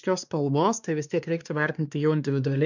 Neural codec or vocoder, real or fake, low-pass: codec, 16 kHz, 2 kbps, X-Codec, WavLM features, trained on Multilingual LibriSpeech; fake; 7.2 kHz